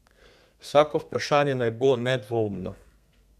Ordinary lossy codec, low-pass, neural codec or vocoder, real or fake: none; 14.4 kHz; codec, 32 kHz, 1.9 kbps, SNAC; fake